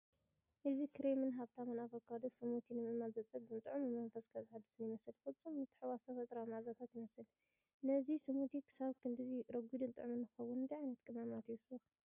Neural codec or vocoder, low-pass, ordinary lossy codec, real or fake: none; 3.6 kHz; AAC, 32 kbps; real